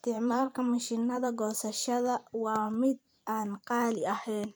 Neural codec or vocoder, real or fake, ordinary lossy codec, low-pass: vocoder, 44.1 kHz, 128 mel bands every 512 samples, BigVGAN v2; fake; none; none